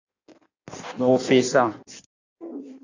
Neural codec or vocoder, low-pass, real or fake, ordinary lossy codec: codec, 16 kHz in and 24 kHz out, 1.1 kbps, FireRedTTS-2 codec; 7.2 kHz; fake; AAC, 48 kbps